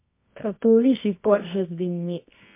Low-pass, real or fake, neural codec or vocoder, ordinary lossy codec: 3.6 kHz; fake; codec, 24 kHz, 0.9 kbps, WavTokenizer, medium music audio release; MP3, 24 kbps